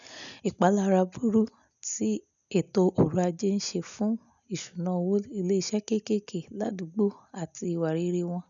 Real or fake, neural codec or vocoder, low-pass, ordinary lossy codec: real; none; 7.2 kHz; none